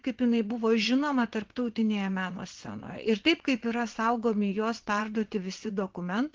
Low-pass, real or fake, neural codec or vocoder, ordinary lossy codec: 7.2 kHz; fake; vocoder, 22.05 kHz, 80 mel bands, WaveNeXt; Opus, 16 kbps